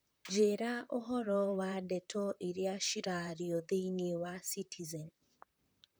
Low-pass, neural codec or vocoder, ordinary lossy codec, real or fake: none; vocoder, 44.1 kHz, 128 mel bands, Pupu-Vocoder; none; fake